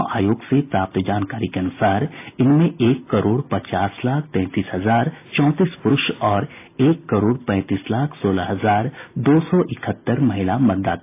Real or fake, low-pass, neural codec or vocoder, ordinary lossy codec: real; 3.6 kHz; none; AAC, 24 kbps